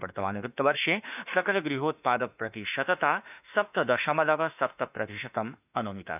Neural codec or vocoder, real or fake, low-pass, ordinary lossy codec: autoencoder, 48 kHz, 32 numbers a frame, DAC-VAE, trained on Japanese speech; fake; 3.6 kHz; none